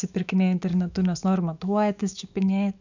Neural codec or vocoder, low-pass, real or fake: autoencoder, 48 kHz, 128 numbers a frame, DAC-VAE, trained on Japanese speech; 7.2 kHz; fake